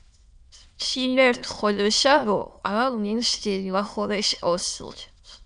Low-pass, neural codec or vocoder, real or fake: 9.9 kHz; autoencoder, 22.05 kHz, a latent of 192 numbers a frame, VITS, trained on many speakers; fake